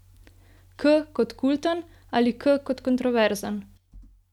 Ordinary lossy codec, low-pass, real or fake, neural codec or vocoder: none; 19.8 kHz; real; none